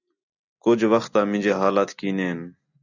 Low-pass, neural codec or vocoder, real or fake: 7.2 kHz; none; real